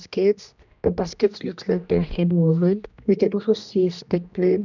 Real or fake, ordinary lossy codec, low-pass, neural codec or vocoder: fake; none; 7.2 kHz; codec, 16 kHz, 1 kbps, X-Codec, HuBERT features, trained on general audio